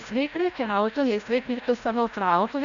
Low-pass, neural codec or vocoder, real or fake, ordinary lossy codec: 7.2 kHz; codec, 16 kHz, 0.5 kbps, FreqCodec, larger model; fake; Opus, 64 kbps